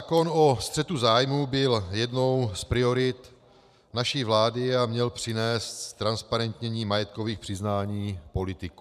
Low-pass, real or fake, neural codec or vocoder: 14.4 kHz; fake; vocoder, 44.1 kHz, 128 mel bands every 512 samples, BigVGAN v2